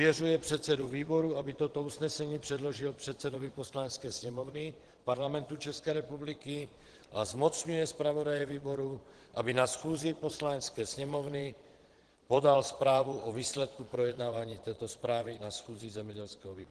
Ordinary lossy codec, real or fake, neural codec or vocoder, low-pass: Opus, 16 kbps; fake; vocoder, 22.05 kHz, 80 mel bands, Vocos; 9.9 kHz